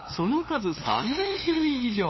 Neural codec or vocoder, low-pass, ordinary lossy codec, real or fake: codec, 16 kHz, 2 kbps, FunCodec, trained on LibriTTS, 25 frames a second; 7.2 kHz; MP3, 24 kbps; fake